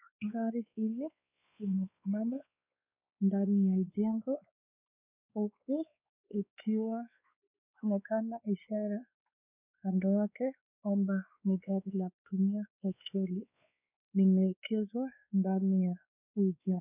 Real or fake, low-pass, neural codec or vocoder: fake; 3.6 kHz; codec, 16 kHz, 2 kbps, X-Codec, WavLM features, trained on Multilingual LibriSpeech